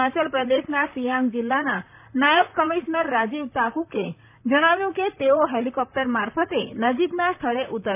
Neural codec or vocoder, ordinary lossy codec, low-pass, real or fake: vocoder, 44.1 kHz, 128 mel bands, Pupu-Vocoder; none; 3.6 kHz; fake